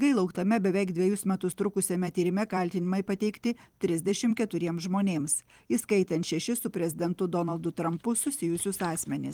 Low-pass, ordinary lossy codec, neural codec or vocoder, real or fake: 19.8 kHz; Opus, 24 kbps; vocoder, 44.1 kHz, 128 mel bands every 256 samples, BigVGAN v2; fake